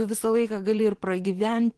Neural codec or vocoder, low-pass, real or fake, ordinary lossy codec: vocoder, 24 kHz, 100 mel bands, Vocos; 10.8 kHz; fake; Opus, 16 kbps